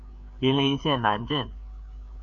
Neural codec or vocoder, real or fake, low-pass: codec, 16 kHz, 4 kbps, FreqCodec, larger model; fake; 7.2 kHz